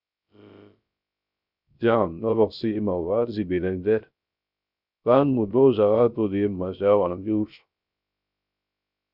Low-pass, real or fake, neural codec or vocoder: 5.4 kHz; fake; codec, 16 kHz, 0.3 kbps, FocalCodec